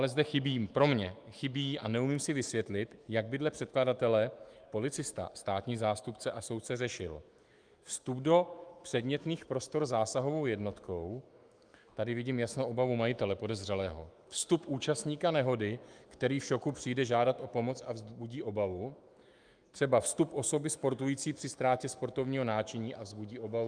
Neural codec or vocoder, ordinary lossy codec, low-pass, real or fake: autoencoder, 48 kHz, 128 numbers a frame, DAC-VAE, trained on Japanese speech; Opus, 32 kbps; 9.9 kHz; fake